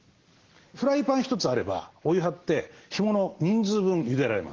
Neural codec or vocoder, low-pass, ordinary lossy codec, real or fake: none; 7.2 kHz; Opus, 16 kbps; real